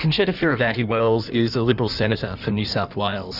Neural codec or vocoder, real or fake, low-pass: codec, 16 kHz in and 24 kHz out, 1.1 kbps, FireRedTTS-2 codec; fake; 5.4 kHz